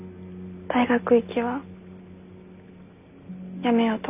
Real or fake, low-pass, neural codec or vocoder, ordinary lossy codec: real; 3.6 kHz; none; none